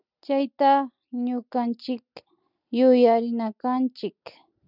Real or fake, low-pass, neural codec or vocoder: real; 5.4 kHz; none